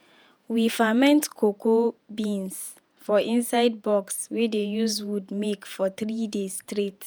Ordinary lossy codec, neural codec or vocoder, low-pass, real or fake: none; vocoder, 48 kHz, 128 mel bands, Vocos; none; fake